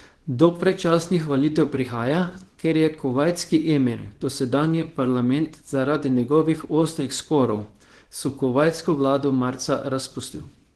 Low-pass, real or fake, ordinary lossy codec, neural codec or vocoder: 10.8 kHz; fake; Opus, 16 kbps; codec, 24 kHz, 0.9 kbps, WavTokenizer, small release